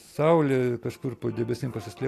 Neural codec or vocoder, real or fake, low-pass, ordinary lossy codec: none; real; 14.4 kHz; AAC, 64 kbps